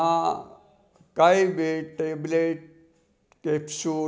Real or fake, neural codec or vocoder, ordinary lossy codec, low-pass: real; none; none; none